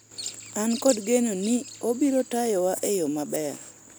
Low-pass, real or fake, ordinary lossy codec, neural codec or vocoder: none; real; none; none